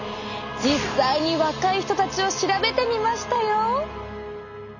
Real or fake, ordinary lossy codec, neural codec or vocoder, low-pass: real; none; none; 7.2 kHz